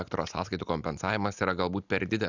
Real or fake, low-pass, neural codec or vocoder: real; 7.2 kHz; none